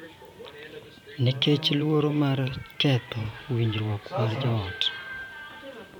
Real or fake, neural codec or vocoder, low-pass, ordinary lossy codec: fake; vocoder, 44.1 kHz, 128 mel bands every 512 samples, BigVGAN v2; 19.8 kHz; none